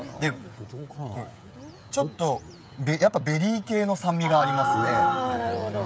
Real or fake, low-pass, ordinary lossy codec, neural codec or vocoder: fake; none; none; codec, 16 kHz, 16 kbps, FreqCodec, smaller model